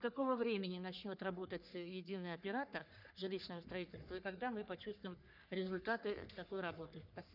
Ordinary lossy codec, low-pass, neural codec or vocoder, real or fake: none; 5.4 kHz; codec, 44.1 kHz, 3.4 kbps, Pupu-Codec; fake